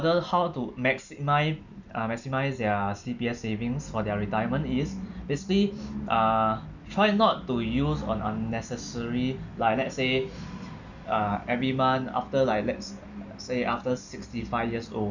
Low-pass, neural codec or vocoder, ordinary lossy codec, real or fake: 7.2 kHz; none; none; real